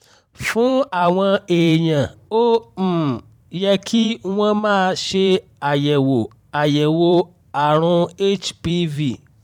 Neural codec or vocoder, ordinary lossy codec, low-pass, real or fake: vocoder, 44.1 kHz, 128 mel bands every 512 samples, BigVGAN v2; none; 19.8 kHz; fake